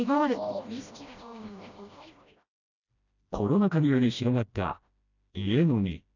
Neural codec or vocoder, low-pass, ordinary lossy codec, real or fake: codec, 16 kHz, 1 kbps, FreqCodec, smaller model; 7.2 kHz; none; fake